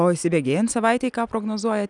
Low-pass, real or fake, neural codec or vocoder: 10.8 kHz; real; none